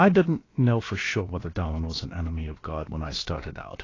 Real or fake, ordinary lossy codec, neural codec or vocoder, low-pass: fake; AAC, 32 kbps; codec, 16 kHz, about 1 kbps, DyCAST, with the encoder's durations; 7.2 kHz